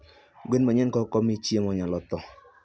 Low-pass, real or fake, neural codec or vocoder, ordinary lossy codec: none; real; none; none